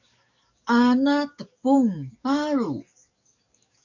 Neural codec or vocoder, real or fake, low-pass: codec, 44.1 kHz, 7.8 kbps, DAC; fake; 7.2 kHz